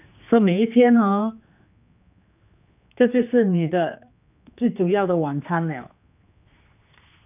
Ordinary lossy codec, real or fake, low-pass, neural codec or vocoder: none; fake; 3.6 kHz; codec, 16 kHz, 2 kbps, X-Codec, HuBERT features, trained on general audio